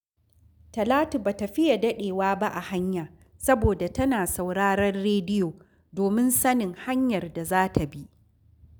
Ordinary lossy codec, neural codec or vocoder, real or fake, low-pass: none; none; real; none